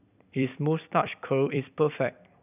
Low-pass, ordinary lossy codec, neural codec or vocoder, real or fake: 3.6 kHz; none; vocoder, 44.1 kHz, 128 mel bands every 256 samples, BigVGAN v2; fake